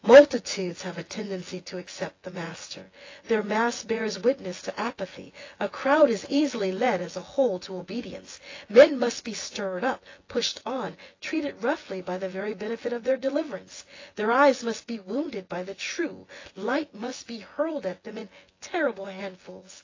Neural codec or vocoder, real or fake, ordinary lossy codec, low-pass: vocoder, 24 kHz, 100 mel bands, Vocos; fake; AAC, 32 kbps; 7.2 kHz